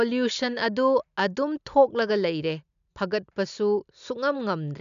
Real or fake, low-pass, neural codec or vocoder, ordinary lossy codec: real; 7.2 kHz; none; MP3, 96 kbps